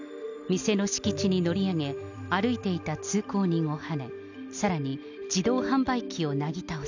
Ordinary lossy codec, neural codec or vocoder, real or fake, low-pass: none; none; real; 7.2 kHz